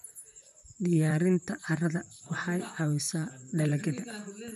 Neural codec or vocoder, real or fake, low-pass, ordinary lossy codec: vocoder, 44.1 kHz, 128 mel bands, Pupu-Vocoder; fake; 14.4 kHz; none